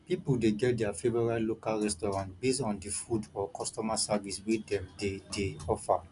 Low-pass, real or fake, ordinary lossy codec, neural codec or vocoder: 10.8 kHz; real; none; none